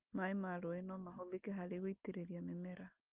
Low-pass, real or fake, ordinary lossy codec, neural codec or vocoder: 3.6 kHz; real; Opus, 24 kbps; none